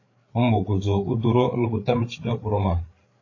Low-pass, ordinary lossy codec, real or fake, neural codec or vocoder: 7.2 kHz; AAC, 32 kbps; fake; codec, 16 kHz, 8 kbps, FreqCodec, larger model